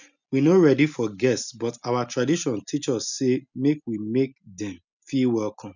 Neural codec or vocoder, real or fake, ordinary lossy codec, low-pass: none; real; none; 7.2 kHz